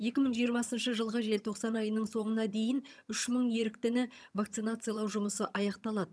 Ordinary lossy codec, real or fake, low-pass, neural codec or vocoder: none; fake; none; vocoder, 22.05 kHz, 80 mel bands, HiFi-GAN